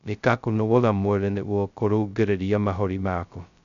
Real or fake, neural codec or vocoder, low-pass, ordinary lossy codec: fake; codec, 16 kHz, 0.2 kbps, FocalCodec; 7.2 kHz; none